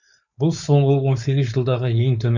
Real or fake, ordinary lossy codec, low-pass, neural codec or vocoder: fake; none; 7.2 kHz; codec, 16 kHz, 4.8 kbps, FACodec